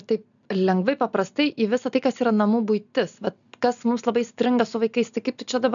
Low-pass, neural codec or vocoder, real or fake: 7.2 kHz; none; real